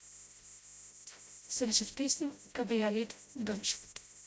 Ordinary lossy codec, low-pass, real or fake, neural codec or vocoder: none; none; fake; codec, 16 kHz, 0.5 kbps, FreqCodec, smaller model